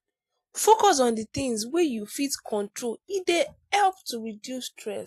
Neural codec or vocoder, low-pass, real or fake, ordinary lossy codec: none; 14.4 kHz; real; AAC, 64 kbps